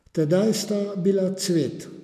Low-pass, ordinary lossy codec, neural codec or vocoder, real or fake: 14.4 kHz; none; none; real